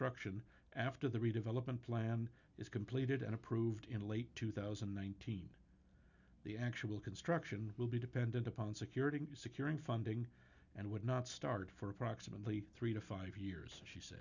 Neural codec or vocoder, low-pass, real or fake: none; 7.2 kHz; real